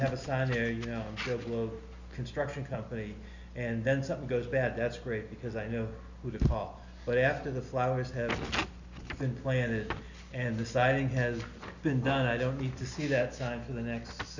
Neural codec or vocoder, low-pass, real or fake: none; 7.2 kHz; real